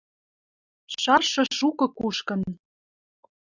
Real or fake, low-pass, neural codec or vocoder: real; 7.2 kHz; none